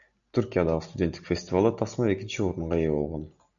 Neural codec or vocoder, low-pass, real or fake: none; 7.2 kHz; real